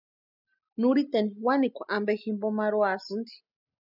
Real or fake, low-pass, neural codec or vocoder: real; 5.4 kHz; none